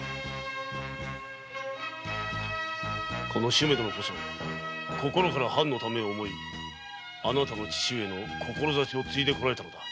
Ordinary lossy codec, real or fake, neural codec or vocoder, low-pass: none; real; none; none